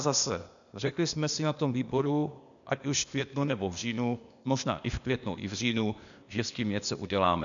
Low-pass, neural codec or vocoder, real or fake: 7.2 kHz; codec, 16 kHz, 0.8 kbps, ZipCodec; fake